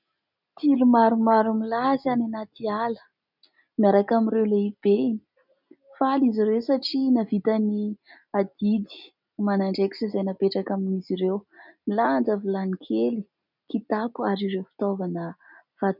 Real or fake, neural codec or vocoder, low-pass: real; none; 5.4 kHz